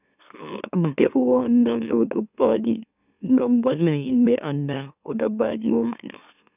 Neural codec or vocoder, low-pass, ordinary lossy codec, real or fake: autoencoder, 44.1 kHz, a latent of 192 numbers a frame, MeloTTS; 3.6 kHz; none; fake